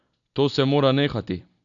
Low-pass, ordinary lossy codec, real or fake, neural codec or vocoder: 7.2 kHz; none; real; none